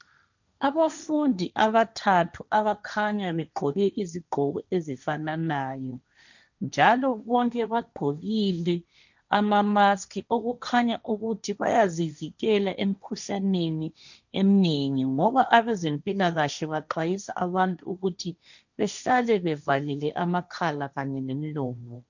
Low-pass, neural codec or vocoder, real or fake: 7.2 kHz; codec, 16 kHz, 1.1 kbps, Voila-Tokenizer; fake